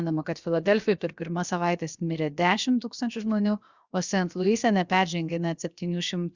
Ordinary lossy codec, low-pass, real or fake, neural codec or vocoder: Opus, 64 kbps; 7.2 kHz; fake; codec, 16 kHz, 0.7 kbps, FocalCodec